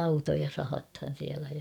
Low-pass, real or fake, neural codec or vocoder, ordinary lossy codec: 19.8 kHz; fake; codec, 44.1 kHz, 7.8 kbps, DAC; none